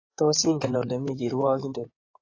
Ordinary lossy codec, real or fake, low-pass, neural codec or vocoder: AAC, 32 kbps; fake; 7.2 kHz; codec, 16 kHz in and 24 kHz out, 2.2 kbps, FireRedTTS-2 codec